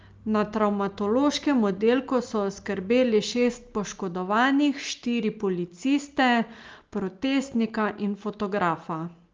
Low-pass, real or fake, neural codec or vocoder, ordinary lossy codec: 7.2 kHz; real; none; Opus, 24 kbps